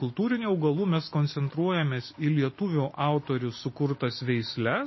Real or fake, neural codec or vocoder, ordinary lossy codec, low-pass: real; none; MP3, 24 kbps; 7.2 kHz